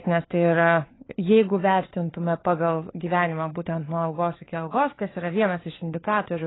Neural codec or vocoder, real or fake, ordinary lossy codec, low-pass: codec, 16 kHz, 4 kbps, FunCodec, trained on LibriTTS, 50 frames a second; fake; AAC, 16 kbps; 7.2 kHz